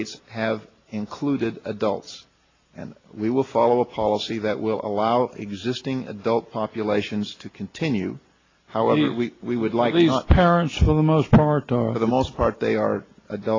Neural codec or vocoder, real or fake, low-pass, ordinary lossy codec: none; real; 7.2 kHz; AAC, 32 kbps